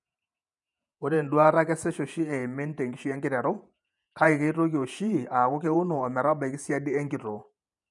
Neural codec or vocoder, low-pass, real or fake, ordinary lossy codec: vocoder, 48 kHz, 128 mel bands, Vocos; 10.8 kHz; fake; none